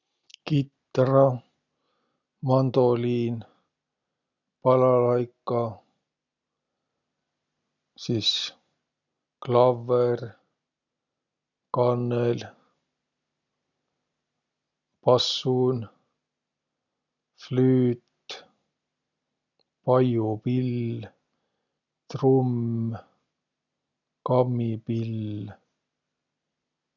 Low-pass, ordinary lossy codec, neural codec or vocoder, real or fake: 7.2 kHz; none; none; real